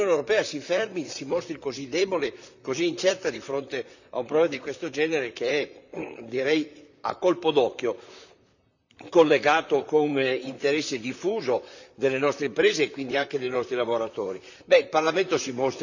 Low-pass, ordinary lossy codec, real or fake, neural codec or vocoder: 7.2 kHz; none; fake; vocoder, 44.1 kHz, 128 mel bands, Pupu-Vocoder